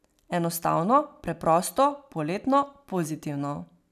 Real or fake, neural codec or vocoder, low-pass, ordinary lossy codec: real; none; 14.4 kHz; none